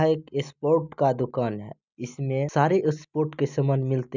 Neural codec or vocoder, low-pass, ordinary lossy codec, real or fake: none; 7.2 kHz; none; real